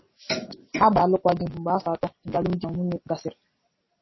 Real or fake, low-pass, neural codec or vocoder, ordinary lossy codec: real; 7.2 kHz; none; MP3, 24 kbps